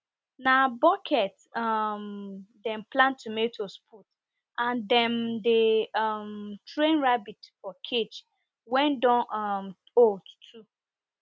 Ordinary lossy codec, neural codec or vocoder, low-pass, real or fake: none; none; none; real